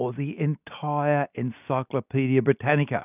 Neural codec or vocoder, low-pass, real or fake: none; 3.6 kHz; real